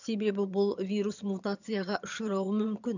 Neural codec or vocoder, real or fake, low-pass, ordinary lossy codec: vocoder, 22.05 kHz, 80 mel bands, HiFi-GAN; fake; 7.2 kHz; none